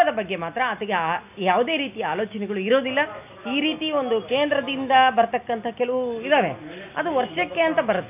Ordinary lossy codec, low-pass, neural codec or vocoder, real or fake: none; 3.6 kHz; none; real